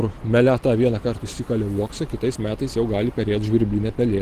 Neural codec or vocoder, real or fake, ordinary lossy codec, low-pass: none; real; Opus, 16 kbps; 14.4 kHz